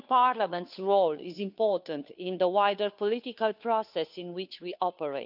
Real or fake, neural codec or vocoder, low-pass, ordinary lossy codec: fake; codec, 16 kHz, 2 kbps, FunCodec, trained on Chinese and English, 25 frames a second; 5.4 kHz; none